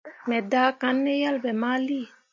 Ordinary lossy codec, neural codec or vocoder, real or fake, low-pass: MP3, 48 kbps; none; real; 7.2 kHz